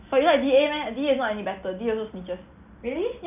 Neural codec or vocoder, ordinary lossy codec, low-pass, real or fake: none; none; 3.6 kHz; real